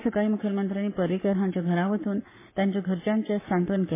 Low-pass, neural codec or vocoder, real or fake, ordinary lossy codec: 3.6 kHz; codec, 44.1 kHz, 7.8 kbps, Pupu-Codec; fake; MP3, 16 kbps